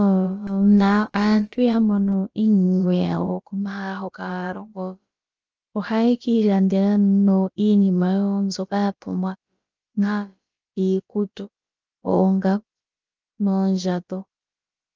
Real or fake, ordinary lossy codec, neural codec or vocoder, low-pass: fake; Opus, 24 kbps; codec, 16 kHz, about 1 kbps, DyCAST, with the encoder's durations; 7.2 kHz